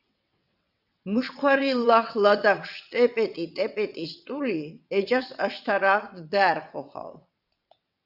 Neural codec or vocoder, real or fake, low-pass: vocoder, 22.05 kHz, 80 mel bands, WaveNeXt; fake; 5.4 kHz